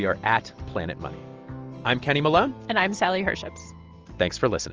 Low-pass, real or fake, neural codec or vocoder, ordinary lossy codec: 7.2 kHz; real; none; Opus, 24 kbps